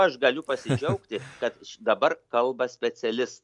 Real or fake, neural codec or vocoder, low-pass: real; none; 10.8 kHz